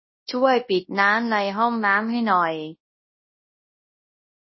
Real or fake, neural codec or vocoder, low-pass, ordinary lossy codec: fake; codec, 24 kHz, 0.9 kbps, WavTokenizer, large speech release; 7.2 kHz; MP3, 24 kbps